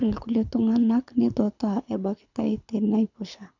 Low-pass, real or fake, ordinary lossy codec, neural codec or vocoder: 7.2 kHz; fake; none; codec, 24 kHz, 6 kbps, HILCodec